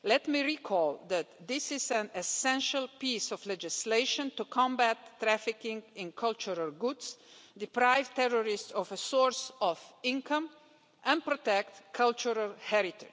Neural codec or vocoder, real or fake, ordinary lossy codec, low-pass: none; real; none; none